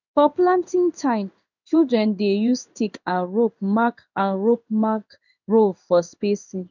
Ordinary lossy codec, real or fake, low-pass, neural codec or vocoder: none; fake; 7.2 kHz; codec, 16 kHz in and 24 kHz out, 1 kbps, XY-Tokenizer